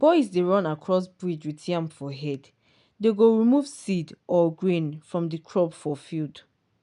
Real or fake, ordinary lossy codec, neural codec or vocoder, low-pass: real; none; none; 10.8 kHz